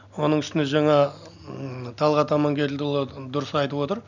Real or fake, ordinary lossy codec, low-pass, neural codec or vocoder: real; none; 7.2 kHz; none